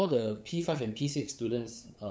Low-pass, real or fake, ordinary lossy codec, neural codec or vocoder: none; fake; none; codec, 16 kHz, 8 kbps, FunCodec, trained on LibriTTS, 25 frames a second